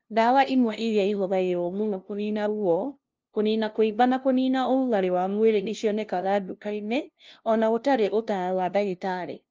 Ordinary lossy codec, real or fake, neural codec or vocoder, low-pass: Opus, 24 kbps; fake; codec, 16 kHz, 0.5 kbps, FunCodec, trained on LibriTTS, 25 frames a second; 7.2 kHz